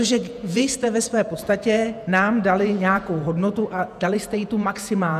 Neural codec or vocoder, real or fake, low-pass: vocoder, 48 kHz, 128 mel bands, Vocos; fake; 14.4 kHz